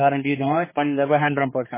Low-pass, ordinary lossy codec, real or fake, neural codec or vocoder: 3.6 kHz; MP3, 16 kbps; fake; codec, 16 kHz, 4 kbps, X-Codec, HuBERT features, trained on general audio